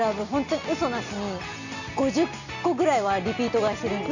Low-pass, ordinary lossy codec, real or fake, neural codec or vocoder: 7.2 kHz; none; real; none